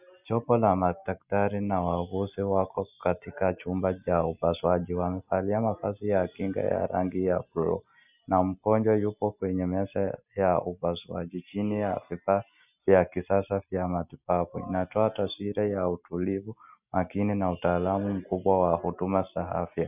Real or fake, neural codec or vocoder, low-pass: real; none; 3.6 kHz